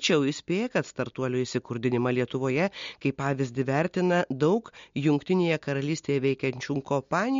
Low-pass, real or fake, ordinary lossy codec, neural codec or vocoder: 7.2 kHz; real; MP3, 48 kbps; none